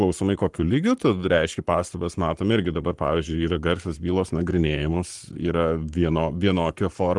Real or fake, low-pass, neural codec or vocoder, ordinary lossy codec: fake; 10.8 kHz; codec, 44.1 kHz, 7.8 kbps, Pupu-Codec; Opus, 24 kbps